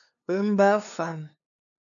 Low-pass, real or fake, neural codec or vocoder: 7.2 kHz; fake; codec, 16 kHz, 2 kbps, FunCodec, trained on LibriTTS, 25 frames a second